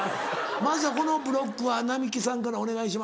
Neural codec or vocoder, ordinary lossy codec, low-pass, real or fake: none; none; none; real